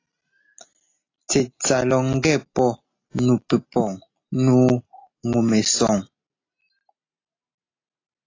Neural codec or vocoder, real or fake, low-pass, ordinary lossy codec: none; real; 7.2 kHz; AAC, 32 kbps